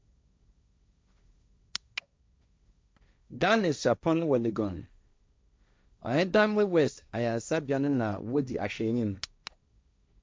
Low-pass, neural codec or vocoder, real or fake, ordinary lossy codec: none; codec, 16 kHz, 1.1 kbps, Voila-Tokenizer; fake; none